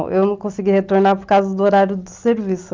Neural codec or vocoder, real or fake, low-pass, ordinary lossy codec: none; real; 7.2 kHz; Opus, 32 kbps